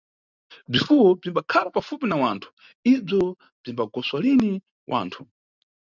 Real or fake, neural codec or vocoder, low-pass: real; none; 7.2 kHz